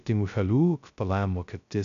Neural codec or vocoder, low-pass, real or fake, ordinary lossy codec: codec, 16 kHz, 0.2 kbps, FocalCodec; 7.2 kHz; fake; MP3, 96 kbps